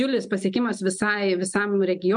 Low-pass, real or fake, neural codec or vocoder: 9.9 kHz; real; none